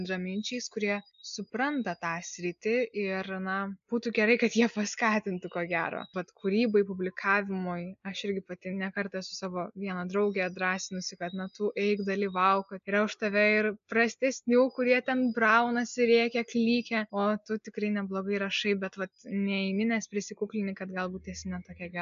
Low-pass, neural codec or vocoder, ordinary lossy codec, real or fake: 7.2 kHz; none; MP3, 64 kbps; real